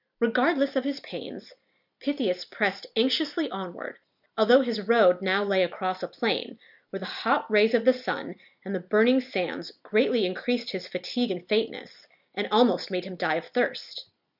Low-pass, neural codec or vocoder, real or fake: 5.4 kHz; none; real